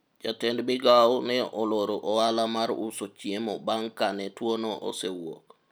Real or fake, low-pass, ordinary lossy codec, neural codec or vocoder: real; none; none; none